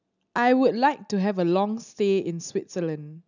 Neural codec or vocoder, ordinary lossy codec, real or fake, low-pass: none; none; real; 7.2 kHz